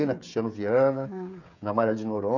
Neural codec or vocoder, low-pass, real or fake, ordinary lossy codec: codec, 16 kHz, 16 kbps, FreqCodec, smaller model; 7.2 kHz; fake; none